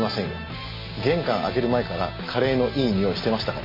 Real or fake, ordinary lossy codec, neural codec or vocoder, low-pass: real; MP3, 24 kbps; none; 5.4 kHz